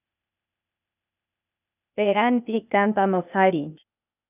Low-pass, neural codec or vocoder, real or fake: 3.6 kHz; codec, 16 kHz, 0.8 kbps, ZipCodec; fake